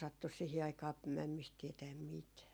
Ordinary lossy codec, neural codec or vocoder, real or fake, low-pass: none; none; real; none